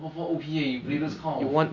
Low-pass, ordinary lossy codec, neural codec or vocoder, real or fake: 7.2 kHz; MP3, 48 kbps; none; real